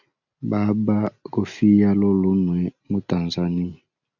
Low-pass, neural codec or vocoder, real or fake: 7.2 kHz; none; real